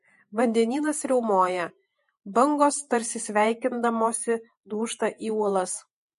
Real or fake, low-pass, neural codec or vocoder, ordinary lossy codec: fake; 14.4 kHz; vocoder, 48 kHz, 128 mel bands, Vocos; MP3, 48 kbps